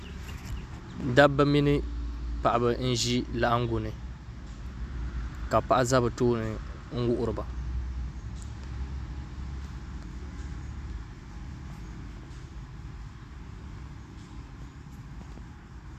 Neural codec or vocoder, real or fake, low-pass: none; real; 14.4 kHz